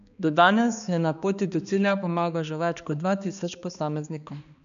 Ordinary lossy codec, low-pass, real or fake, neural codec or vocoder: none; 7.2 kHz; fake; codec, 16 kHz, 2 kbps, X-Codec, HuBERT features, trained on balanced general audio